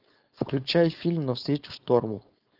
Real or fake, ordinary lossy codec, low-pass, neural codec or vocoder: fake; Opus, 24 kbps; 5.4 kHz; codec, 16 kHz, 4.8 kbps, FACodec